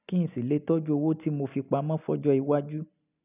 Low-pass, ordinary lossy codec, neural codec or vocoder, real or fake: 3.6 kHz; none; none; real